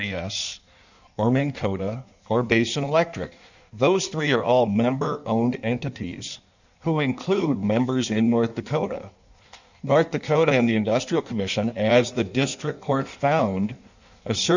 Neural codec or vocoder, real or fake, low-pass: codec, 16 kHz in and 24 kHz out, 1.1 kbps, FireRedTTS-2 codec; fake; 7.2 kHz